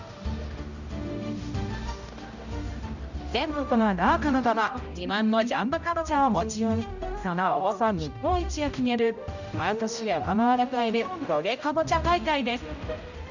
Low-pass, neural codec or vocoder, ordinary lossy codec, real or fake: 7.2 kHz; codec, 16 kHz, 0.5 kbps, X-Codec, HuBERT features, trained on general audio; none; fake